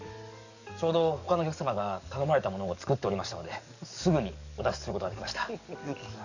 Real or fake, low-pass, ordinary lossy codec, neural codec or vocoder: fake; 7.2 kHz; none; codec, 44.1 kHz, 7.8 kbps, DAC